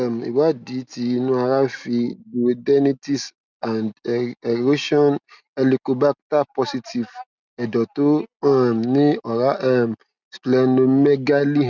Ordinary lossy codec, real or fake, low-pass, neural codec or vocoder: none; real; 7.2 kHz; none